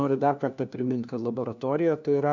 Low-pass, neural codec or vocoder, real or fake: 7.2 kHz; codec, 16 kHz, 1 kbps, FunCodec, trained on LibriTTS, 50 frames a second; fake